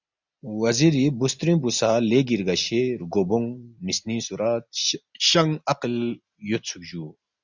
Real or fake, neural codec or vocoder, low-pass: real; none; 7.2 kHz